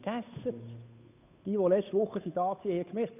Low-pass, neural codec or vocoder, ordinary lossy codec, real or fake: 3.6 kHz; codec, 16 kHz, 8 kbps, FunCodec, trained on Chinese and English, 25 frames a second; none; fake